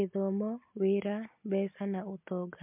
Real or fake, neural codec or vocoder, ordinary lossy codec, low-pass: real; none; MP3, 32 kbps; 3.6 kHz